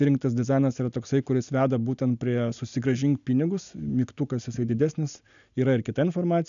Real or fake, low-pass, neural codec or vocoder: real; 7.2 kHz; none